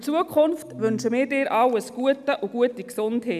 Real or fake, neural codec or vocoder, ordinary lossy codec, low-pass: real; none; none; 14.4 kHz